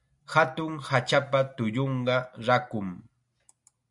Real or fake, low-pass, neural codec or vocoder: real; 10.8 kHz; none